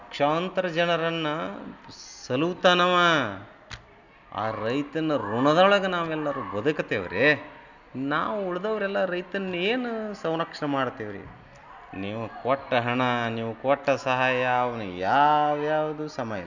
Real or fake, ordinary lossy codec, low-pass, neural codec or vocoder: real; none; 7.2 kHz; none